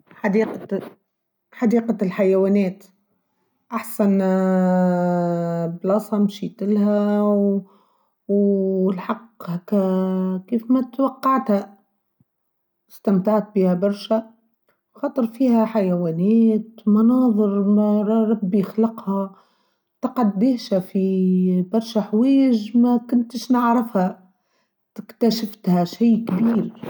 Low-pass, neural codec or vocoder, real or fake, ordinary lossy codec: 19.8 kHz; none; real; none